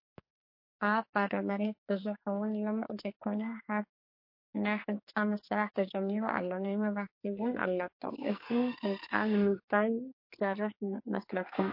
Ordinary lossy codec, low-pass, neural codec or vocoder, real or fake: MP3, 32 kbps; 5.4 kHz; codec, 44.1 kHz, 2.6 kbps, SNAC; fake